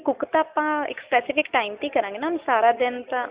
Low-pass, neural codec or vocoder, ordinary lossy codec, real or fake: 3.6 kHz; none; Opus, 64 kbps; real